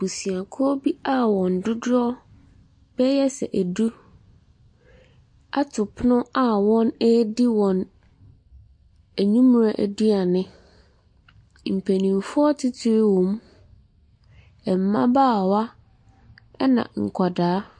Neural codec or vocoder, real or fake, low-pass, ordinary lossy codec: none; real; 9.9 kHz; MP3, 48 kbps